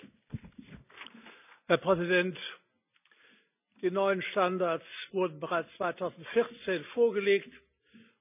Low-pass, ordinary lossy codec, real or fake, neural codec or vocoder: 3.6 kHz; none; real; none